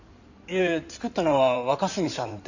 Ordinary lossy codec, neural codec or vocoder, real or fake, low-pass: none; codec, 16 kHz in and 24 kHz out, 2.2 kbps, FireRedTTS-2 codec; fake; 7.2 kHz